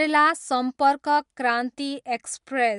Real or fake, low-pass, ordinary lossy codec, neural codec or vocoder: real; 10.8 kHz; MP3, 64 kbps; none